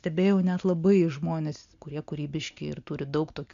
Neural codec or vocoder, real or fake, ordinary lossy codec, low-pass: none; real; MP3, 64 kbps; 7.2 kHz